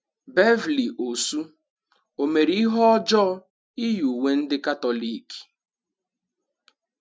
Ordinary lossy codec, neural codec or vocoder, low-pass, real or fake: none; none; none; real